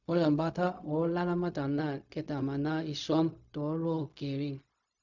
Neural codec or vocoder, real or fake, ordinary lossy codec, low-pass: codec, 16 kHz, 0.4 kbps, LongCat-Audio-Codec; fake; none; 7.2 kHz